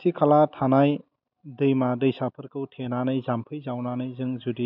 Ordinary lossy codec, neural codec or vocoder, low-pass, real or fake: none; none; 5.4 kHz; real